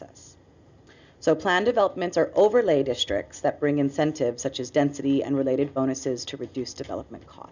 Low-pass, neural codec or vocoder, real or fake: 7.2 kHz; none; real